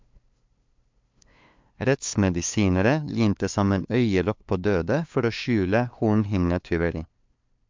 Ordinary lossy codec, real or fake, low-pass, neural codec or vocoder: MP3, 64 kbps; fake; 7.2 kHz; codec, 16 kHz, 2 kbps, FunCodec, trained on LibriTTS, 25 frames a second